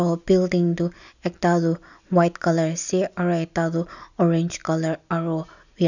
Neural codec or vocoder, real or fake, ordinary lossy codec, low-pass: none; real; none; 7.2 kHz